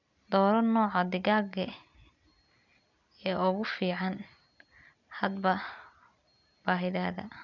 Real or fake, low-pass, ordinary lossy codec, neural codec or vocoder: real; 7.2 kHz; none; none